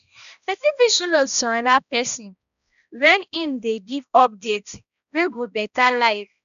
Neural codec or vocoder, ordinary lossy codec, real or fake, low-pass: codec, 16 kHz, 1 kbps, X-Codec, HuBERT features, trained on balanced general audio; none; fake; 7.2 kHz